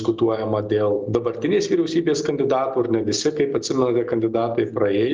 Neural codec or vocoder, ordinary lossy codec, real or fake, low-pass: none; Opus, 32 kbps; real; 7.2 kHz